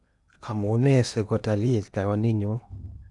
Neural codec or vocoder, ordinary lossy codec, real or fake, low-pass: codec, 16 kHz in and 24 kHz out, 0.8 kbps, FocalCodec, streaming, 65536 codes; none; fake; 10.8 kHz